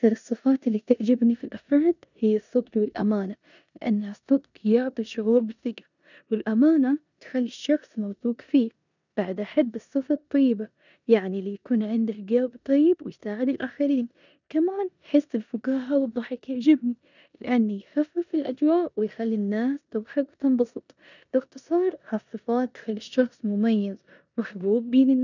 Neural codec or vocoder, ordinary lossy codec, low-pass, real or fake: codec, 16 kHz in and 24 kHz out, 0.9 kbps, LongCat-Audio-Codec, four codebook decoder; none; 7.2 kHz; fake